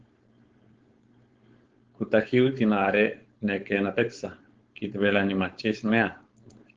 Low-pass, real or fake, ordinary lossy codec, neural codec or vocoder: 7.2 kHz; fake; Opus, 16 kbps; codec, 16 kHz, 4.8 kbps, FACodec